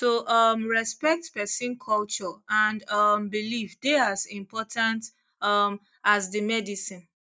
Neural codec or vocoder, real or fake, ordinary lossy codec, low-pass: none; real; none; none